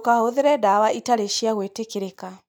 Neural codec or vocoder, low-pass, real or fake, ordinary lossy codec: none; none; real; none